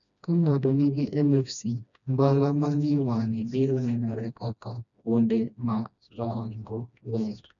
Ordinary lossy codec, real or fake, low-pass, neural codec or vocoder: none; fake; 7.2 kHz; codec, 16 kHz, 1 kbps, FreqCodec, smaller model